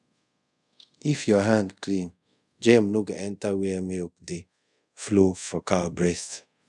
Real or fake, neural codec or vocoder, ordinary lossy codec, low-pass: fake; codec, 24 kHz, 0.5 kbps, DualCodec; none; 10.8 kHz